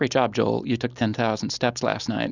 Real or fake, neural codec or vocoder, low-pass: real; none; 7.2 kHz